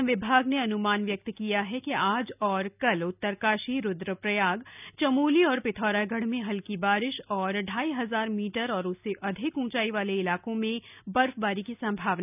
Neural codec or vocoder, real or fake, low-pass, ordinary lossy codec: none; real; 3.6 kHz; none